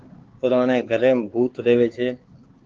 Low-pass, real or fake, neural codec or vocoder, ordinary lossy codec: 7.2 kHz; fake; codec, 16 kHz, 4 kbps, X-Codec, HuBERT features, trained on LibriSpeech; Opus, 16 kbps